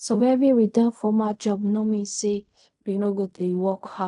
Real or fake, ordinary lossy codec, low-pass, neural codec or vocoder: fake; none; 10.8 kHz; codec, 16 kHz in and 24 kHz out, 0.4 kbps, LongCat-Audio-Codec, fine tuned four codebook decoder